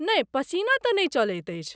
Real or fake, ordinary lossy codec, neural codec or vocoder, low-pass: real; none; none; none